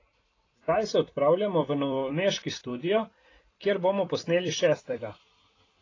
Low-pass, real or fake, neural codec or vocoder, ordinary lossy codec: 7.2 kHz; fake; vocoder, 44.1 kHz, 128 mel bands every 256 samples, BigVGAN v2; AAC, 32 kbps